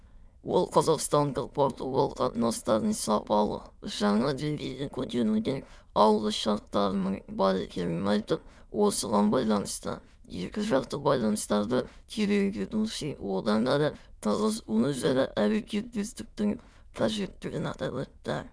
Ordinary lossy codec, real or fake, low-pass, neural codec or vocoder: none; fake; none; autoencoder, 22.05 kHz, a latent of 192 numbers a frame, VITS, trained on many speakers